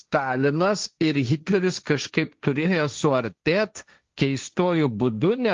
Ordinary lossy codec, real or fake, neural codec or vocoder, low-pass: Opus, 32 kbps; fake; codec, 16 kHz, 1.1 kbps, Voila-Tokenizer; 7.2 kHz